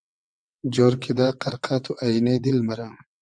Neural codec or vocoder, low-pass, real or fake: vocoder, 22.05 kHz, 80 mel bands, WaveNeXt; 9.9 kHz; fake